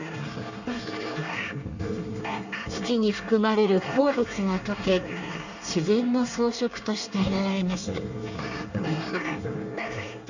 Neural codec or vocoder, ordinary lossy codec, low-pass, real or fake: codec, 24 kHz, 1 kbps, SNAC; none; 7.2 kHz; fake